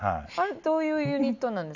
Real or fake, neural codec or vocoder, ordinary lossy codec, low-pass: real; none; none; 7.2 kHz